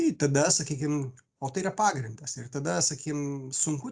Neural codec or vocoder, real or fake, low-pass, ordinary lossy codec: none; real; 9.9 kHz; Opus, 64 kbps